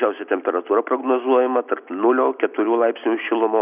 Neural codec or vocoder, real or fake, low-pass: none; real; 3.6 kHz